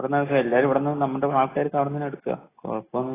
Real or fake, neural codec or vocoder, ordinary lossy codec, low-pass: real; none; AAC, 16 kbps; 3.6 kHz